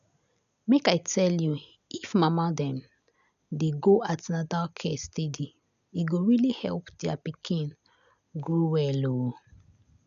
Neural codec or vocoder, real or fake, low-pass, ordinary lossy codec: none; real; 7.2 kHz; none